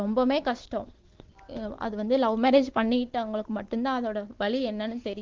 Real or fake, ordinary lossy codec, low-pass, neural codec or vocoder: real; Opus, 16 kbps; 7.2 kHz; none